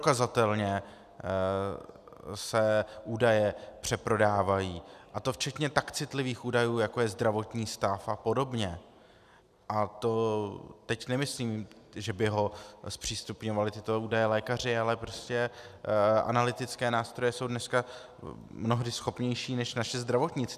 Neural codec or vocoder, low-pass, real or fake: none; 14.4 kHz; real